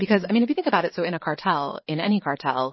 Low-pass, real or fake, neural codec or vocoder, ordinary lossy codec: 7.2 kHz; fake; vocoder, 22.05 kHz, 80 mel bands, Vocos; MP3, 24 kbps